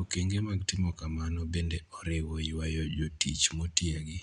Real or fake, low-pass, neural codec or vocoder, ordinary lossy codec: real; 9.9 kHz; none; none